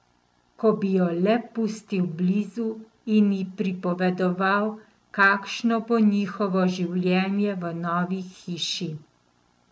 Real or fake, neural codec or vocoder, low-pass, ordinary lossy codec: real; none; none; none